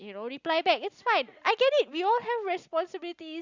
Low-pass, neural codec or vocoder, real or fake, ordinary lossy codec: 7.2 kHz; none; real; Opus, 64 kbps